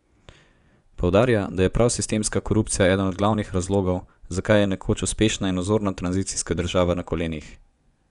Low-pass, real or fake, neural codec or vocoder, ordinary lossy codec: 10.8 kHz; fake; vocoder, 24 kHz, 100 mel bands, Vocos; none